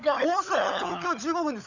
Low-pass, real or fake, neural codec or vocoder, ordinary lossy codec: 7.2 kHz; fake; codec, 16 kHz, 16 kbps, FunCodec, trained on LibriTTS, 50 frames a second; none